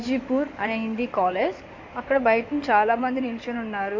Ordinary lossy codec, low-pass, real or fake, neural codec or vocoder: MP3, 48 kbps; 7.2 kHz; fake; codec, 16 kHz in and 24 kHz out, 2.2 kbps, FireRedTTS-2 codec